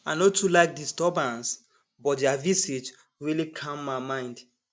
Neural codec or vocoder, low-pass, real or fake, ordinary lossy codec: none; none; real; none